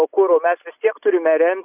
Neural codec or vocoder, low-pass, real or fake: none; 3.6 kHz; real